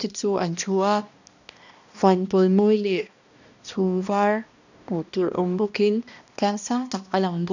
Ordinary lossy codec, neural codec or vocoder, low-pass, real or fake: none; codec, 16 kHz, 1 kbps, X-Codec, HuBERT features, trained on balanced general audio; 7.2 kHz; fake